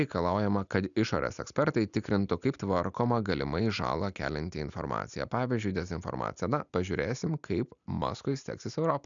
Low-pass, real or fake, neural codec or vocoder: 7.2 kHz; real; none